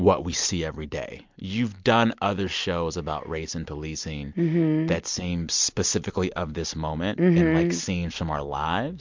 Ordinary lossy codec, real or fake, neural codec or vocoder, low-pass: MP3, 64 kbps; real; none; 7.2 kHz